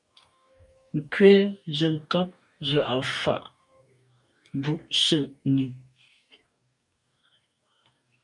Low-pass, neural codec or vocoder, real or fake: 10.8 kHz; codec, 44.1 kHz, 2.6 kbps, DAC; fake